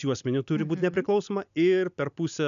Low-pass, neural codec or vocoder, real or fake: 7.2 kHz; none; real